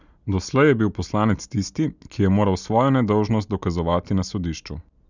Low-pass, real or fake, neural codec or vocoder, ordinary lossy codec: 7.2 kHz; real; none; none